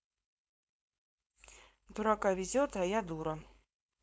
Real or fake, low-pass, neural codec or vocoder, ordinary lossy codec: fake; none; codec, 16 kHz, 4.8 kbps, FACodec; none